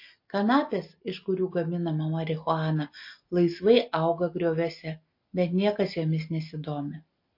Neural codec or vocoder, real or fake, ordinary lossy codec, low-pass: none; real; MP3, 32 kbps; 5.4 kHz